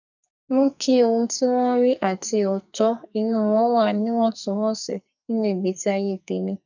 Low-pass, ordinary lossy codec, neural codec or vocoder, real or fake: 7.2 kHz; none; codec, 44.1 kHz, 2.6 kbps, SNAC; fake